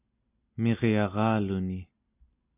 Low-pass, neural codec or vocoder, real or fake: 3.6 kHz; none; real